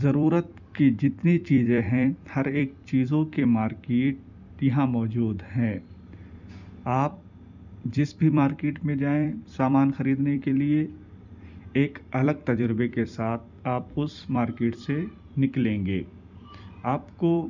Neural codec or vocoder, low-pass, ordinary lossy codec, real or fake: vocoder, 44.1 kHz, 128 mel bands every 256 samples, BigVGAN v2; 7.2 kHz; none; fake